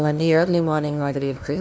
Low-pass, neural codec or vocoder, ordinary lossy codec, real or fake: none; codec, 16 kHz, 0.5 kbps, FunCodec, trained on LibriTTS, 25 frames a second; none; fake